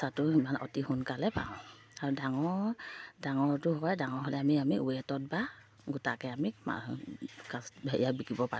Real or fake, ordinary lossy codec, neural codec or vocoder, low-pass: real; none; none; none